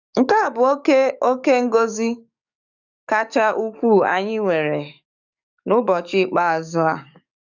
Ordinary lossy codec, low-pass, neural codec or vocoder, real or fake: none; 7.2 kHz; codec, 44.1 kHz, 7.8 kbps, DAC; fake